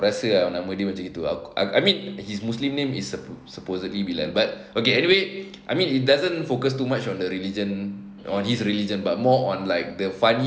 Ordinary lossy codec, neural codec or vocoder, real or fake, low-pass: none; none; real; none